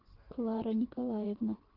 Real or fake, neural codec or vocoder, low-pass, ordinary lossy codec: fake; vocoder, 24 kHz, 100 mel bands, Vocos; 5.4 kHz; Opus, 16 kbps